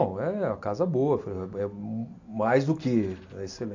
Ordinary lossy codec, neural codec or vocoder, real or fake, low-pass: AAC, 48 kbps; none; real; 7.2 kHz